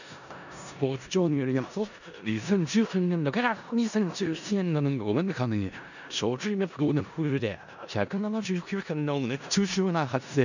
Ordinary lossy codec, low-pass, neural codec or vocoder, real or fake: none; 7.2 kHz; codec, 16 kHz in and 24 kHz out, 0.4 kbps, LongCat-Audio-Codec, four codebook decoder; fake